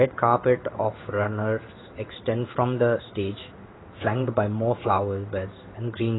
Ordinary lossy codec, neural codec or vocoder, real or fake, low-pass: AAC, 16 kbps; codec, 16 kHz in and 24 kHz out, 1 kbps, XY-Tokenizer; fake; 7.2 kHz